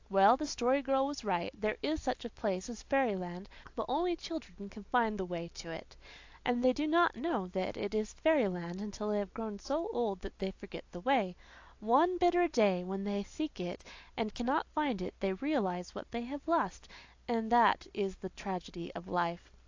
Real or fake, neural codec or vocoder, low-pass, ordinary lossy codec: real; none; 7.2 kHz; AAC, 48 kbps